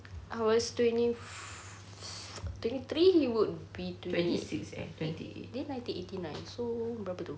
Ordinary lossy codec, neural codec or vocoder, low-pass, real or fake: none; none; none; real